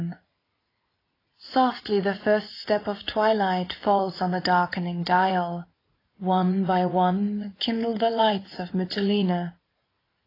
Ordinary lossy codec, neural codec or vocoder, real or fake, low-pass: AAC, 24 kbps; vocoder, 44.1 kHz, 80 mel bands, Vocos; fake; 5.4 kHz